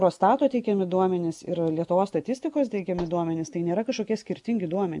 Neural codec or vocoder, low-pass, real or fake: none; 10.8 kHz; real